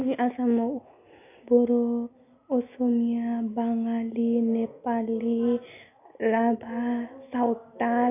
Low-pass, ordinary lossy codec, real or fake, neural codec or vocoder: 3.6 kHz; none; real; none